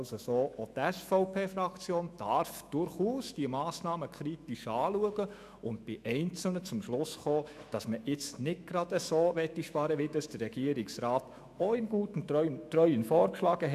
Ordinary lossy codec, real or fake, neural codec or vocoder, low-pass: none; fake; autoencoder, 48 kHz, 128 numbers a frame, DAC-VAE, trained on Japanese speech; 14.4 kHz